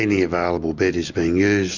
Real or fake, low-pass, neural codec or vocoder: real; 7.2 kHz; none